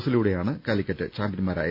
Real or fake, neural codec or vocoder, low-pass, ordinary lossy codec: real; none; 5.4 kHz; none